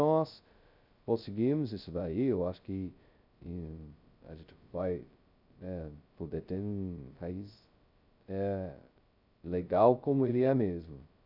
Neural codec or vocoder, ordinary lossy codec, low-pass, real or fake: codec, 16 kHz, 0.2 kbps, FocalCodec; none; 5.4 kHz; fake